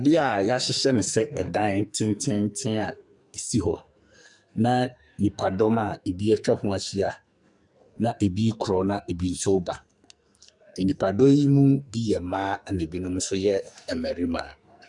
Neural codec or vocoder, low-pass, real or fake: codec, 44.1 kHz, 2.6 kbps, SNAC; 10.8 kHz; fake